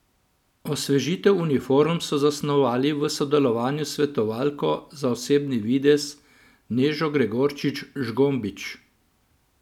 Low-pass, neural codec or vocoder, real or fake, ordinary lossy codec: 19.8 kHz; none; real; none